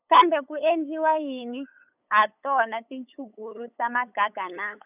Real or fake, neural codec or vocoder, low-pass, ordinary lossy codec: fake; codec, 16 kHz, 8 kbps, FunCodec, trained on LibriTTS, 25 frames a second; 3.6 kHz; none